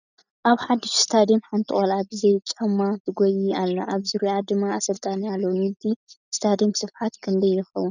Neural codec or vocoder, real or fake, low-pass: none; real; 7.2 kHz